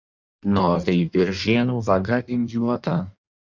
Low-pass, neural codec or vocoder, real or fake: 7.2 kHz; codec, 16 kHz in and 24 kHz out, 1.1 kbps, FireRedTTS-2 codec; fake